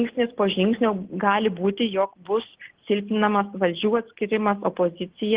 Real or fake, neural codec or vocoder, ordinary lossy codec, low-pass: real; none; Opus, 16 kbps; 3.6 kHz